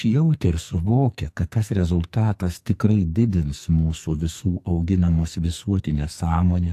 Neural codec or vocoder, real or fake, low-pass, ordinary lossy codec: codec, 44.1 kHz, 2.6 kbps, SNAC; fake; 14.4 kHz; AAC, 64 kbps